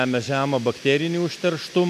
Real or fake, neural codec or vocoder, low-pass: real; none; 14.4 kHz